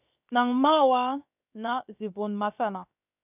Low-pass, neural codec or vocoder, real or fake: 3.6 kHz; codec, 16 kHz, 0.7 kbps, FocalCodec; fake